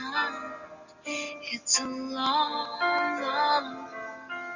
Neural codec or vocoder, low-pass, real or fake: none; 7.2 kHz; real